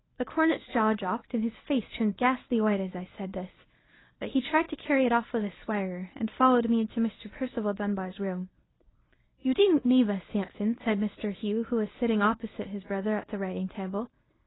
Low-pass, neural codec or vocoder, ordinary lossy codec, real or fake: 7.2 kHz; codec, 24 kHz, 0.9 kbps, WavTokenizer, medium speech release version 1; AAC, 16 kbps; fake